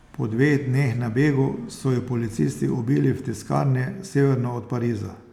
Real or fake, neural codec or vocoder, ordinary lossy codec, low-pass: real; none; none; 19.8 kHz